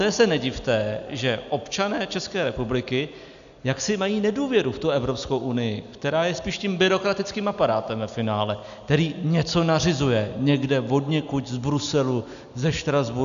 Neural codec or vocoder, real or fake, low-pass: none; real; 7.2 kHz